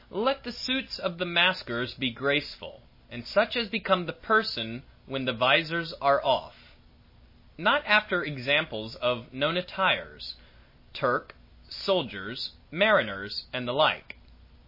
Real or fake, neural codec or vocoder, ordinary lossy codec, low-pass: real; none; MP3, 24 kbps; 5.4 kHz